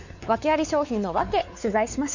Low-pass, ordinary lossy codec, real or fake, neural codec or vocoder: 7.2 kHz; none; fake; codec, 16 kHz, 4 kbps, X-Codec, WavLM features, trained on Multilingual LibriSpeech